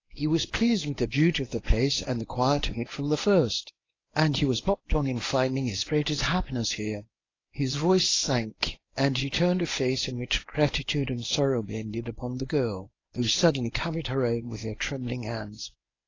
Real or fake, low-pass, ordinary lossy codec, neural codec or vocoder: fake; 7.2 kHz; AAC, 32 kbps; codec, 24 kHz, 0.9 kbps, WavTokenizer, medium speech release version 1